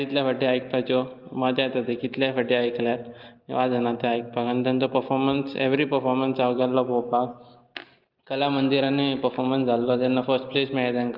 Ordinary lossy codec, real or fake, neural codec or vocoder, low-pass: Opus, 32 kbps; real; none; 5.4 kHz